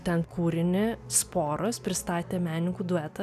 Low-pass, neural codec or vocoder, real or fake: 14.4 kHz; none; real